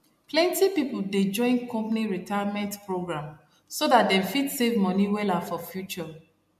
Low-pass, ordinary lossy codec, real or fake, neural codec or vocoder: 14.4 kHz; MP3, 64 kbps; real; none